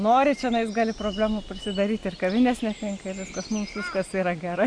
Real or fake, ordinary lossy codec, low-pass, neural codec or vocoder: real; Opus, 64 kbps; 9.9 kHz; none